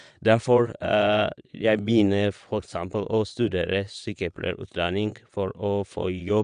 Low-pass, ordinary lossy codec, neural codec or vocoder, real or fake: 9.9 kHz; none; vocoder, 22.05 kHz, 80 mel bands, WaveNeXt; fake